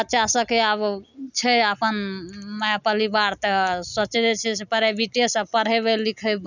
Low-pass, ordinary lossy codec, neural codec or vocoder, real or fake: 7.2 kHz; none; none; real